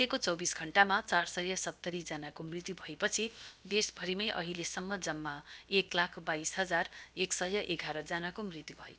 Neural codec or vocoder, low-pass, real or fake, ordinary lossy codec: codec, 16 kHz, about 1 kbps, DyCAST, with the encoder's durations; none; fake; none